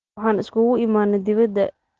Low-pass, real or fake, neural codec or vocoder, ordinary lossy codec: 7.2 kHz; real; none; Opus, 16 kbps